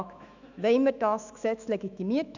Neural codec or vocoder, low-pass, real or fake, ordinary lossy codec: autoencoder, 48 kHz, 128 numbers a frame, DAC-VAE, trained on Japanese speech; 7.2 kHz; fake; none